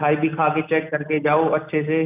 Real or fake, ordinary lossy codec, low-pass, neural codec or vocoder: real; none; 3.6 kHz; none